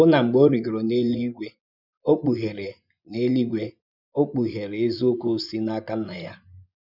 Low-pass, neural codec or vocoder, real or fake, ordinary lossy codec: 5.4 kHz; vocoder, 24 kHz, 100 mel bands, Vocos; fake; none